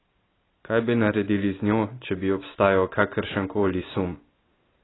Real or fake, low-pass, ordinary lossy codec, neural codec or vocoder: real; 7.2 kHz; AAC, 16 kbps; none